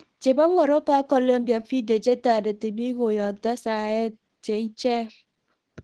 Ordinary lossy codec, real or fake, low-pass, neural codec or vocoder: Opus, 16 kbps; fake; 10.8 kHz; codec, 24 kHz, 0.9 kbps, WavTokenizer, small release